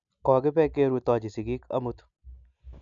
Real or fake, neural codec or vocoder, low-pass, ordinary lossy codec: real; none; 7.2 kHz; none